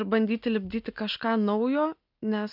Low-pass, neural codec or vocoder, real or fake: 5.4 kHz; none; real